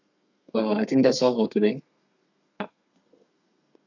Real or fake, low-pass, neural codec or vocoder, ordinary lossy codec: fake; 7.2 kHz; codec, 32 kHz, 1.9 kbps, SNAC; none